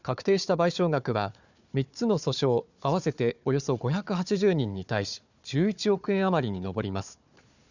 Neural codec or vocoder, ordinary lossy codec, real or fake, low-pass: codec, 16 kHz, 4 kbps, FunCodec, trained on Chinese and English, 50 frames a second; none; fake; 7.2 kHz